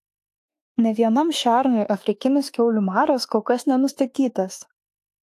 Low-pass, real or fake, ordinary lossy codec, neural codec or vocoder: 14.4 kHz; fake; AAC, 64 kbps; autoencoder, 48 kHz, 32 numbers a frame, DAC-VAE, trained on Japanese speech